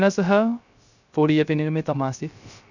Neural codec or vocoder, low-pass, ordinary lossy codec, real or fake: codec, 16 kHz, 0.3 kbps, FocalCodec; 7.2 kHz; none; fake